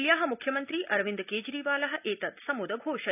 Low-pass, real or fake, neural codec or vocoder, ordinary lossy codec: 3.6 kHz; real; none; none